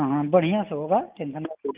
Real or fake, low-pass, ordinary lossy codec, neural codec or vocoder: real; 3.6 kHz; Opus, 32 kbps; none